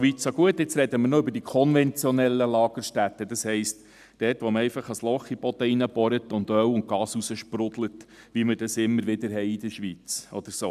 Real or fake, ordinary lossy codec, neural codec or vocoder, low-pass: real; none; none; 14.4 kHz